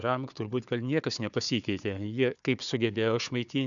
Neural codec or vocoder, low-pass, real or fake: codec, 16 kHz, 4 kbps, FunCodec, trained on Chinese and English, 50 frames a second; 7.2 kHz; fake